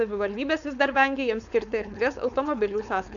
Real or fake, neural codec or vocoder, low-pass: fake; codec, 16 kHz, 4.8 kbps, FACodec; 7.2 kHz